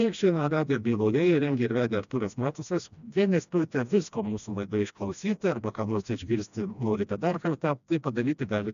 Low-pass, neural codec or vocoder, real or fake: 7.2 kHz; codec, 16 kHz, 1 kbps, FreqCodec, smaller model; fake